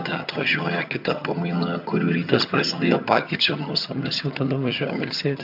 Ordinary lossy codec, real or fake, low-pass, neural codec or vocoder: MP3, 48 kbps; fake; 5.4 kHz; vocoder, 22.05 kHz, 80 mel bands, HiFi-GAN